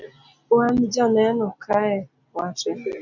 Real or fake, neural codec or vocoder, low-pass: real; none; 7.2 kHz